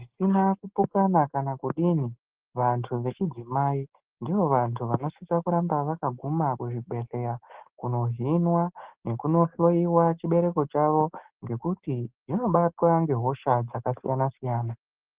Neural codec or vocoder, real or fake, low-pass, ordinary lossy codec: none; real; 3.6 kHz; Opus, 16 kbps